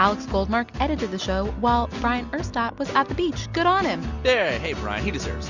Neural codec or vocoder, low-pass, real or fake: none; 7.2 kHz; real